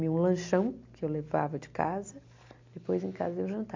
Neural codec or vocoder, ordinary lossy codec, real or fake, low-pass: none; MP3, 64 kbps; real; 7.2 kHz